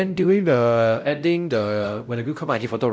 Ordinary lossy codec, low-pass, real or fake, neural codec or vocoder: none; none; fake; codec, 16 kHz, 0.5 kbps, X-Codec, WavLM features, trained on Multilingual LibriSpeech